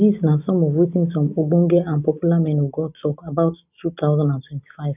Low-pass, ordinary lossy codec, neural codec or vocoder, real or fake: 3.6 kHz; none; none; real